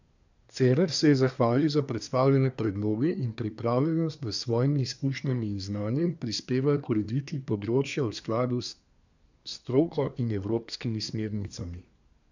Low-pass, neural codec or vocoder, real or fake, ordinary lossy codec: 7.2 kHz; codec, 24 kHz, 1 kbps, SNAC; fake; none